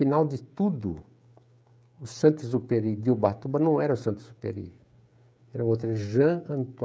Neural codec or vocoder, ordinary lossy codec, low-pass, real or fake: codec, 16 kHz, 16 kbps, FreqCodec, smaller model; none; none; fake